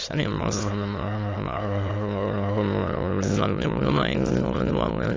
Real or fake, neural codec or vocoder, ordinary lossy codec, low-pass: fake; autoencoder, 22.05 kHz, a latent of 192 numbers a frame, VITS, trained on many speakers; AAC, 32 kbps; 7.2 kHz